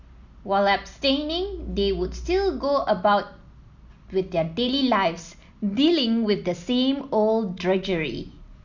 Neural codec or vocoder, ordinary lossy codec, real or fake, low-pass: none; none; real; 7.2 kHz